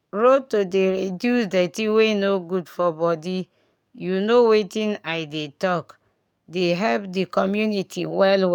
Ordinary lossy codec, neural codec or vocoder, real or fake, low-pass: none; codec, 44.1 kHz, 7.8 kbps, DAC; fake; 19.8 kHz